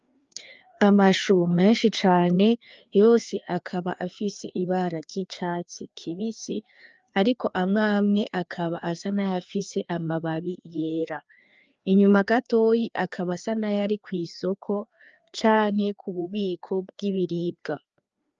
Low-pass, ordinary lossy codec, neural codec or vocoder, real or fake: 7.2 kHz; Opus, 24 kbps; codec, 16 kHz, 2 kbps, FreqCodec, larger model; fake